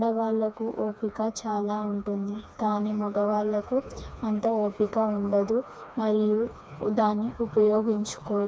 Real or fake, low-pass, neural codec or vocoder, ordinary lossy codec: fake; none; codec, 16 kHz, 2 kbps, FreqCodec, smaller model; none